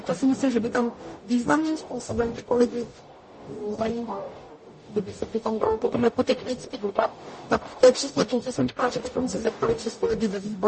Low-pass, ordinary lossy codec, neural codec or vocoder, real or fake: 10.8 kHz; MP3, 32 kbps; codec, 44.1 kHz, 0.9 kbps, DAC; fake